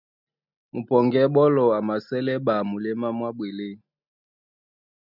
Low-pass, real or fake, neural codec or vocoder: 5.4 kHz; real; none